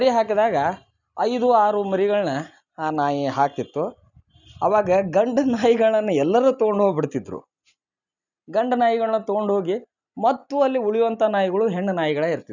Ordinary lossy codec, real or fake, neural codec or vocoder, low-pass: none; real; none; 7.2 kHz